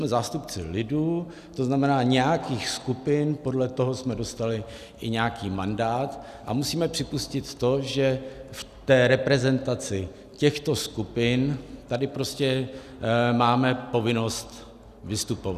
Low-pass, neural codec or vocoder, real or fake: 14.4 kHz; none; real